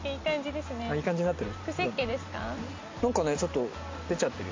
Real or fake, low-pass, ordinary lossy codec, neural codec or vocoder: real; 7.2 kHz; MP3, 48 kbps; none